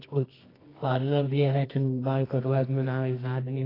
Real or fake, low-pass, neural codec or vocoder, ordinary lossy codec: fake; 5.4 kHz; codec, 24 kHz, 0.9 kbps, WavTokenizer, medium music audio release; AAC, 24 kbps